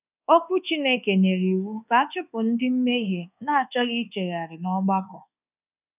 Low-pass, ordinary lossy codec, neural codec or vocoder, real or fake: 3.6 kHz; none; codec, 24 kHz, 1.2 kbps, DualCodec; fake